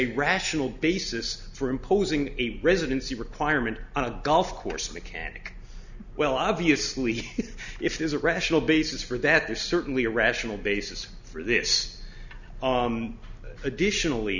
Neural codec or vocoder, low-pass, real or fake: none; 7.2 kHz; real